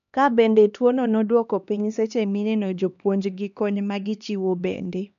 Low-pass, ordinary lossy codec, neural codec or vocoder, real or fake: 7.2 kHz; none; codec, 16 kHz, 2 kbps, X-Codec, HuBERT features, trained on LibriSpeech; fake